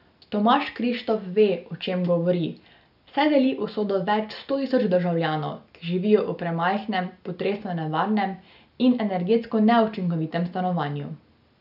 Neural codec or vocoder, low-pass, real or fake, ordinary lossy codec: none; 5.4 kHz; real; none